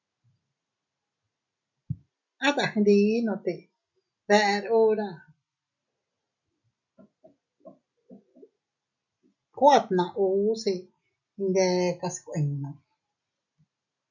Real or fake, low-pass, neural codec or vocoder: real; 7.2 kHz; none